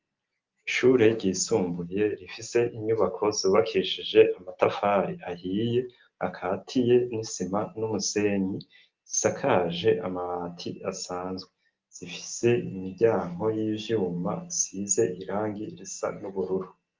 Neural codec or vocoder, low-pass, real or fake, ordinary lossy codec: none; 7.2 kHz; real; Opus, 32 kbps